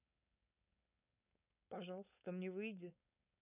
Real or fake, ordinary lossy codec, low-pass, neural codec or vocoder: fake; none; 3.6 kHz; codec, 16 kHz, 4.8 kbps, FACodec